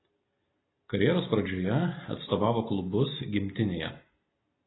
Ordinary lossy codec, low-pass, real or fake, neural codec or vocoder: AAC, 16 kbps; 7.2 kHz; real; none